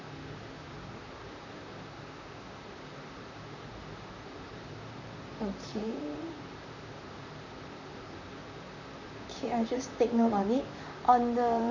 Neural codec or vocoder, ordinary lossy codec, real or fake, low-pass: vocoder, 44.1 kHz, 128 mel bands, Pupu-Vocoder; none; fake; 7.2 kHz